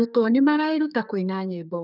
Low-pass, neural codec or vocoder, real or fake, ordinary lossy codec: 5.4 kHz; codec, 32 kHz, 1.9 kbps, SNAC; fake; none